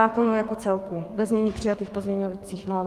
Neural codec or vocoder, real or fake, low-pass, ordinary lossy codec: codec, 32 kHz, 1.9 kbps, SNAC; fake; 14.4 kHz; Opus, 32 kbps